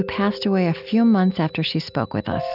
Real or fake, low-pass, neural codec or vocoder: real; 5.4 kHz; none